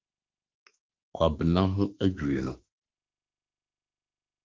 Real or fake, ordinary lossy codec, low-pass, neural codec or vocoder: fake; Opus, 32 kbps; 7.2 kHz; autoencoder, 48 kHz, 32 numbers a frame, DAC-VAE, trained on Japanese speech